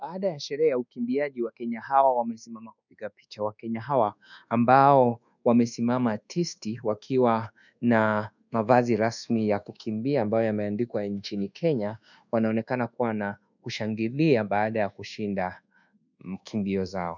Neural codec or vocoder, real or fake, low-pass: codec, 24 kHz, 1.2 kbps, DualCodec; fake; 7.2 kHz